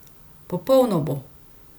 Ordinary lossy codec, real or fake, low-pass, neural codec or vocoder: none; real; none; none